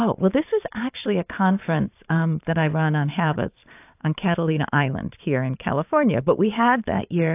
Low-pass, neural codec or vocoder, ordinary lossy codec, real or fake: 3.6 kHz; vocoder, 44.1 kHz, 128 mel bands every 512 samples, BigVGAN v2; AAC, 32 kbps; fake